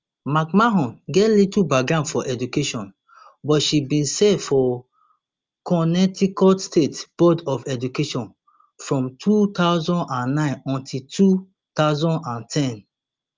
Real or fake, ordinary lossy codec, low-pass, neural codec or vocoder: real; Opus, 32 kbps; 7.2 kHz; none